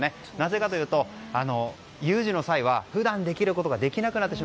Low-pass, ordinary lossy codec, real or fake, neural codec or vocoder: none; none; real; none